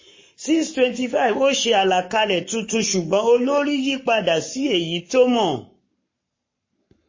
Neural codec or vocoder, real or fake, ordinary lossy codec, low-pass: codec, 44.1 kHz, 7.8 kbps, Pupu-Codec; fake; MP3, 32 kbps; 7.2 kHz